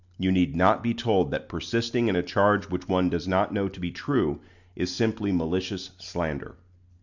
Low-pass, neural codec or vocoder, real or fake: 7.2 kHz; none; real